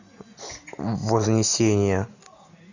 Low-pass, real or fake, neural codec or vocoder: 7.2 kHz; real; none